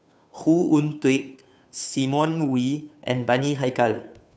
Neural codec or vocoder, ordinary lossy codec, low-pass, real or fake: codec, 16 kHz, 2 kbps, FunCodec, trained on Chinese and English, 25 frames a second; none; none; fake